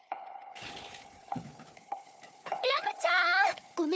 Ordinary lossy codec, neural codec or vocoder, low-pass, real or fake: none; codec, 16 kHz, 4 kbps, FunCodec, trained on Chinese and English, 50 frames a second; none; fake